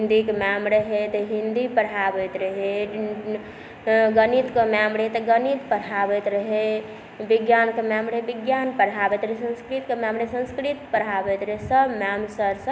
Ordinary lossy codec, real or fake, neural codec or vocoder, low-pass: none; real; none; none